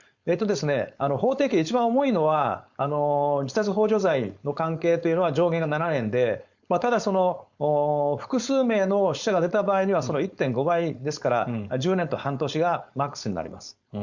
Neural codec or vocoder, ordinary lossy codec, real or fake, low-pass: codec, 16 kHz, 4.8 kbps, FACodec; Opus, 64 kbps; fake; 7.2 kHz